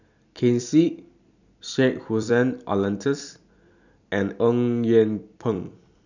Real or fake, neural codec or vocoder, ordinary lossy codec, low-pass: fake; vocoder, 44.1 kHz, 128 mel bands every 256 samples, BigVGAN v2; none; 7.2 kHz